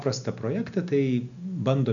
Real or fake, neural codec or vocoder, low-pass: real; none; 7.2 kHz